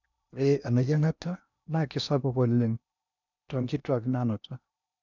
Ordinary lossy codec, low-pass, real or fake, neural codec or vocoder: none; 7.2 kHz; fake; codec, 16 kHz in and 24 kHz out, 0.8 kbps, FocalCodec, streaming, 65536 codes